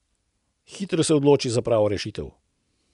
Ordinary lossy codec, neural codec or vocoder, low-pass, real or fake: none; none; 10.8 kHz; real